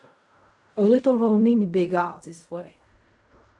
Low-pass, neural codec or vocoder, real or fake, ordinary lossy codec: 10.8 kHz; codec, 16 kHz in and 24 kHz out, 0.4 kbps, LongCat-Audio-Codec, fine tuned four codebook decoder; fake; AAC, 64 kbps